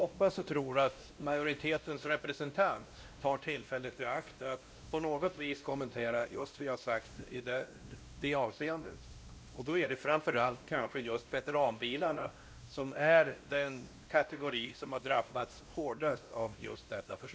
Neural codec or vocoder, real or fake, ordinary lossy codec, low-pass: codec, 16 kHz, 1 kbps, X-Codec, WavLM features, trained on Multilingual LibriSpeech; fake; none; none